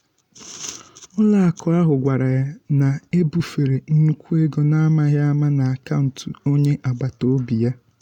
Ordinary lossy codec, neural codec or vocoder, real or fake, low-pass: none; none; real; 19.8 kHz